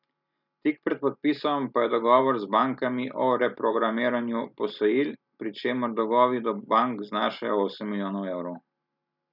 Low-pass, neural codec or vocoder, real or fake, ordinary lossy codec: 5.4 kHz; none; real; none